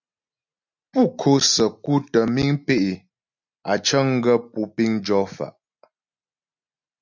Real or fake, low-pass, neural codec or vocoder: real; 7.2 kHz; none